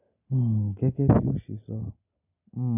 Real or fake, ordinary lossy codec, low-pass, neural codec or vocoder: real; none; 3.6 kHz; none